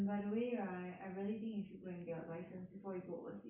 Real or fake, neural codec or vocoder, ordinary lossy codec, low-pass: real; none; none; 3.6 kHz